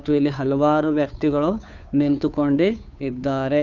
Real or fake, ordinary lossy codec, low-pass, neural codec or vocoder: fake; none; 7.2 kHz; codec, 16 kHz, 2 kbps, FunCodec, trained on Chinese and English, 25 frames a second